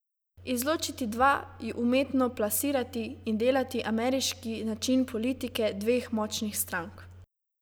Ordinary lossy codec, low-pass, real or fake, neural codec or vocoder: none; none; real; none